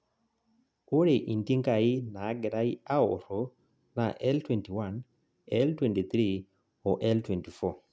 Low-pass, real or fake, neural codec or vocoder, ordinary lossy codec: none; real; none; none